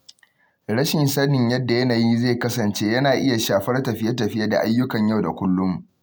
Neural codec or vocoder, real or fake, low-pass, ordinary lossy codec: none; real; none; none